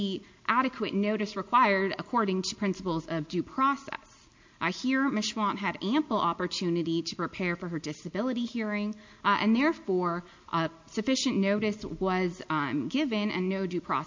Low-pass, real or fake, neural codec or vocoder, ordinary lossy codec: 7.2 kHz; real; none; MP3, 48 kbps